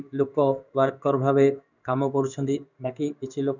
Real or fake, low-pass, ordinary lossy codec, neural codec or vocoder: fake; 7.2 kHz; none; codec, 16 kHz in and 24 kHz out, 1 kbps, XY-Tokenizer